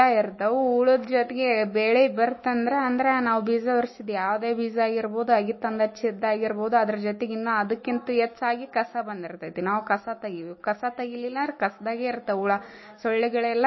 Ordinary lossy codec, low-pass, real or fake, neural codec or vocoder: MP3, 24 kbps; 7.2 kHz; fake; autoencoder, 48 kHz, 128 numbers a frame, DAC-VAE, trained on Japanese speech